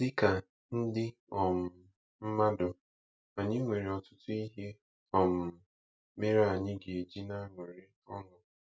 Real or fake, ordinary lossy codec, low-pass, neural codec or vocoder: real; none; none; none